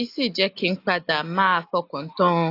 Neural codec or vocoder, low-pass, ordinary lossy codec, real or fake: none; 5.4 kHz; AAC, 32 kbps; real